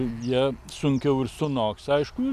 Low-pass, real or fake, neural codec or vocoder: 14.4 kHz; real; none